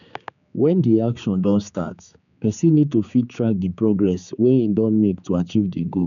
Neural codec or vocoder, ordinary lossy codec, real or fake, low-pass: codec, 16 kHz, 4 kbps, X-Codec, HuBERT features, trained on general audio; none; fake; 7.2 kHz